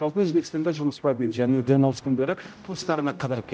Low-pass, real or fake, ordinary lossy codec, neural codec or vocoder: none; fake; none; codec, 16 kHz, 0.5 kbps, X-Codec, HuBERT features, trained on general audio